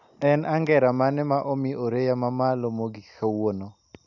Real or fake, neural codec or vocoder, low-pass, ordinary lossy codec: real; none; 7.2 kHz; none